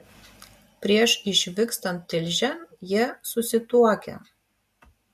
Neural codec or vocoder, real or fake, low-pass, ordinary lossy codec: none; real; 14.4 kHz; MP3, 64 kbps